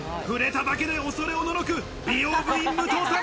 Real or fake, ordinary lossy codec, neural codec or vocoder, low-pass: real; none; none; none